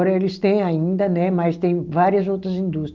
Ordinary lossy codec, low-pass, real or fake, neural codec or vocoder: Opus, 32 kbps; 7.2 kHz; real; none